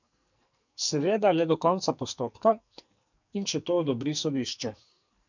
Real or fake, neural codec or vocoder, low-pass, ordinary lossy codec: fake; codec, 44.1 kHz, 2.6 kbps, SNAC; 7.2 kHz; none